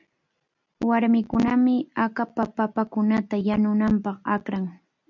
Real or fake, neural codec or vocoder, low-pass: real; none; 7.2 kHz